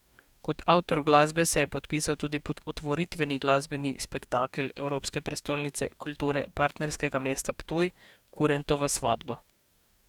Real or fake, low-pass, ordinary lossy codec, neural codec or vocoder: fake; 19.8 kHz; none; codec, 44.1 kHz, 2.6 kbps, DAC